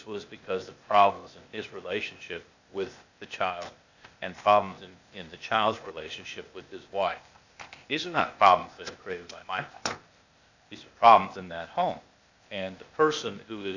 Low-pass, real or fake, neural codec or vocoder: 7.2 kHz; fake; codec, 16 kHz, 0.8 kbps, ZipCodec